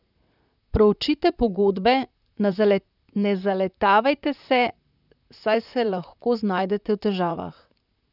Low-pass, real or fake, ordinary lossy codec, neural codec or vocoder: 5.4 kHz; fake; none; vocoder, 44.1 kHz, 128 mel bands, Pupu-Vocoder